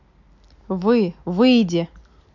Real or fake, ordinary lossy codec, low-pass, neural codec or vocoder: real; none; 7.2 kHz; none